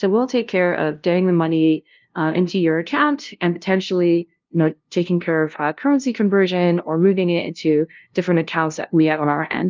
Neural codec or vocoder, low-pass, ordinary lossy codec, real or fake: codec, 16 kHz, 0.5 kbps, FunCodec, trained on LibriTTS, 25 frames a second; 7.2 kHz; Opus, 32 kbps; fake